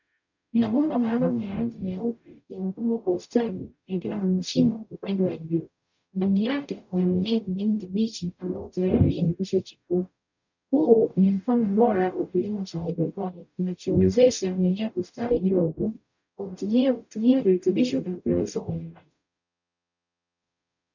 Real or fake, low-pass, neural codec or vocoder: fake; 7.2 kHz; codec, 44.1 kHz, 0.9 kbps, DAC